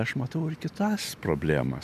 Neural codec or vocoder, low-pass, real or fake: none; 14.4 kHz; real